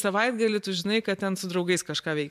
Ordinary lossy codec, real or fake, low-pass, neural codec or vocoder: MP3, 96 kbps; fake; 14.4 kHz; vocoder, 44.1 kHz, 128 mel bands every 512 samples, BigVGAN v2